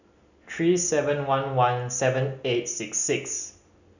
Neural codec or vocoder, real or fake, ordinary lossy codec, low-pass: none; real; none; 7.2 kHz